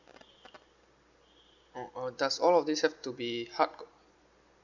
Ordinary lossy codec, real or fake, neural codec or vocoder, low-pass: none; real; none; 7.2 kHz